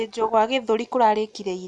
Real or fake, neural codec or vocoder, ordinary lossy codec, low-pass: real; none; none; 10.8 kHz